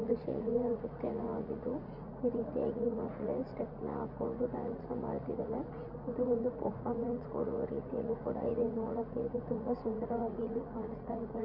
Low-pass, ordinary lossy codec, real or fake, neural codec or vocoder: 5.4 kHz; none; fake; vocoder, 44.1 kHz, 80 mel bands, Vocos